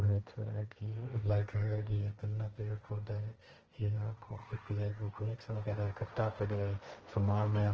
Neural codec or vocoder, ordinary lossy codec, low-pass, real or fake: codec, 16 kHz, 1.1 kbps, Voila-Tokenizer; Opus, 16 kbps; 7.2 kHz; fake